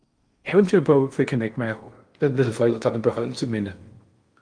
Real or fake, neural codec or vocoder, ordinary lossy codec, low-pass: fake; codec, 16 kHz in and 24 kHz out, 0.6 kbps, FocalCodec, streaming, 4096 codes; Opus, 32 kbps; 9.9 kHz